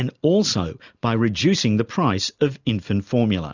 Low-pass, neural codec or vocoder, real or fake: 7.2 kHz; none; real